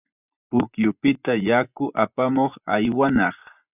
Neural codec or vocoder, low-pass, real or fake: vocoder, 24 kHz, 100 mel bands, Vocos; 3.6 kHz; fake